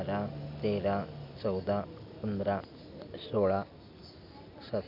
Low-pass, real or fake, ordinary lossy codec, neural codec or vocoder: 5.4 kHz; real; none; none